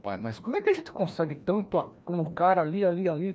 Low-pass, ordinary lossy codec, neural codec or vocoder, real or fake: none; none; codec, 16 kHz, 1 kbps, FreqCodec, larger model; fake